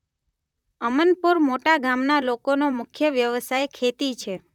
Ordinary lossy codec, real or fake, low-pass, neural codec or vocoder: none; fake; 19.8 kHz; vocoder, 44.1 kHz, 128 mel bands, Pupu-Vocoder